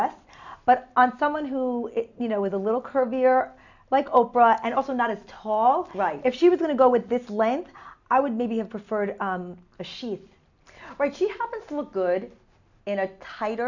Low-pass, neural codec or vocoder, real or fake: 7.2 kHz; none; real